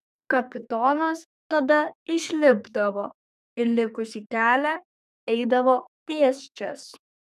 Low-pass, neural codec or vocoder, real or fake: 14.4 kHz; codec, 32 kHz, 1.9 kbps, SNAC; fake